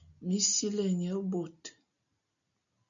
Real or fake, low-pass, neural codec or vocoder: real; 7.2 kHz; none